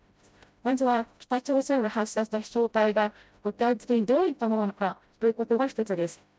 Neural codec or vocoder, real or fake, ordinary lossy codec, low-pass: codec, 16 kHz, 0.5 kbps, FreqCodec, smaller model; fake; none; none